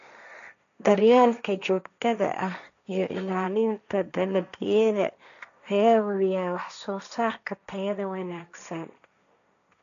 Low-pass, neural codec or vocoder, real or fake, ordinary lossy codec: 7.2 kHz; codec, 16 kHz, 1.1 kbps, Voila-Tokenizer; fake; none